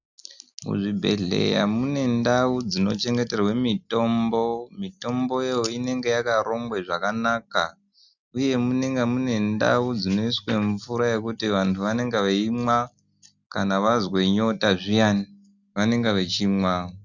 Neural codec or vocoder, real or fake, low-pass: none; real; 7.2 kHz